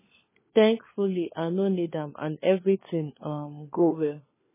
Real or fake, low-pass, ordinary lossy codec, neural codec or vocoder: fake; 3.6 kHz; MP3, 16 kbps; codec, 16 kHz, 4 kbps, FunCodec, trained on LibriTTS, 50 frames a second